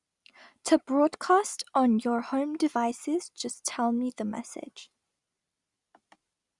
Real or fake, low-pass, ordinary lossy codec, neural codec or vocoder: real; 10.8 kHz; Opus, 64 kbps; none